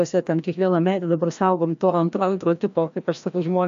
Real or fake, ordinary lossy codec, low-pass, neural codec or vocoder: fake; AAC, 64 kbps; 7.2 kHz; codec, 16 kHz, 1 kbps, FreqCodec, larger model